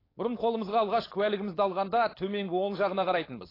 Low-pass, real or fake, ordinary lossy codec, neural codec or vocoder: 5.4 kHz; fake; AAC, 24 kbps; codec, 16 kHz, 4.8 kbps, FACodec